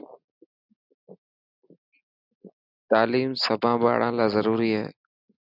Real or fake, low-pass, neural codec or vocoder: real; 5.4 kHz; none